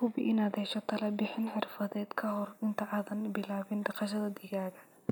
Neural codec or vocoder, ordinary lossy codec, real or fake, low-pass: none; none; real; none